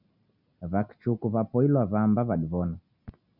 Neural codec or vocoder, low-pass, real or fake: none; 5.4 kHz; real